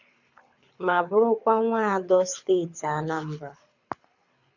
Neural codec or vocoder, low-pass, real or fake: codec, 24 kHz, 6 kbps, HILCodec; 7.2 kHz; fake